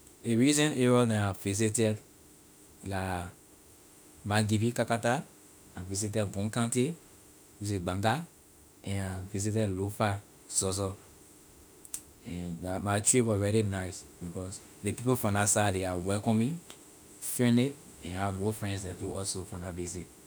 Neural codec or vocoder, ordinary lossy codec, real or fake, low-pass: autoencoder, 48 kHz, 32 numbers a frame, DAC-VAE, trained on Japanese speech; none; fake; none